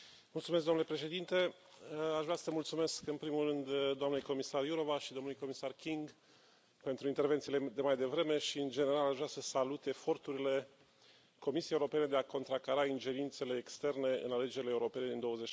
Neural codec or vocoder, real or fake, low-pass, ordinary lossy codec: none; real; none; none